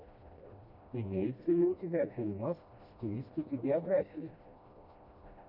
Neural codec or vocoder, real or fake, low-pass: codec, 16 kHz, 1 kbps, FreqCodec, smaller model; fake; 5.4 kHz